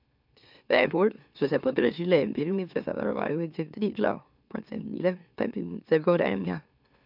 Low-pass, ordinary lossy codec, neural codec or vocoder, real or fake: 5.4 kHz; none; autoencoder, 44.1 kHz, a latent of 192 numbers a frame, MeloTTS; fake